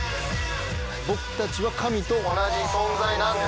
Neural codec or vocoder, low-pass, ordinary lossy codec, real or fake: none; none; none; real